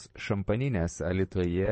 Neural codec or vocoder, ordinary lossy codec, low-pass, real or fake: vocoder, 22.05 kHz, 80 mel bands, Vocos; MP3, 32 kbps; 9.9 kHz; fake